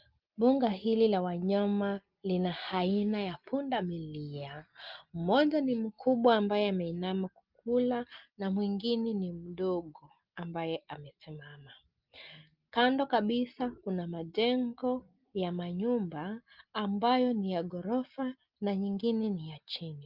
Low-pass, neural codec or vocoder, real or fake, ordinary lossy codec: 5.4 kHz; none; real; Opus, 24 kbps